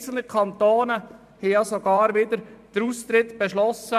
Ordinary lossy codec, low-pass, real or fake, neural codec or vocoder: none; 14.4 kHz; fake; vocoder, 44.1 kHz, 128 mel bands every 512 samples, BigVGAN v2